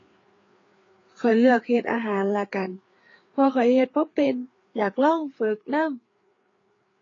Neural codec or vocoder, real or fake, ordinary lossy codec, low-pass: codec, 16 kHz, 4 kbps, FreqCodec, larger model; fake; AAC, 32 kbps; 7.2 kHz